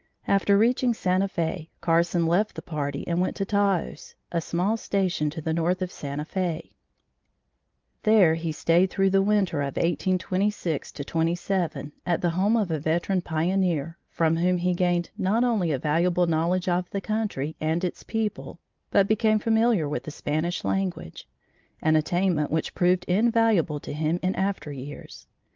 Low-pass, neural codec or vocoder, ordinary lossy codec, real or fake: 7.2 kHz; none; Opus, 24 kbps; real